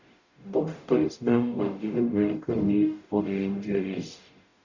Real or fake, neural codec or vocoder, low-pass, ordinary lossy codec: fake; codec, 44.1 kHz, 0.9 kbps, DAC; 7.2 kHz; none